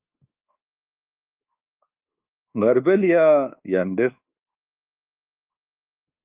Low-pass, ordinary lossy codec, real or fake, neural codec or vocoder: 3.6 kHz; Opus, 32 kbps; fake; codec, 24 kHz, 1.2 kbps, DualCodec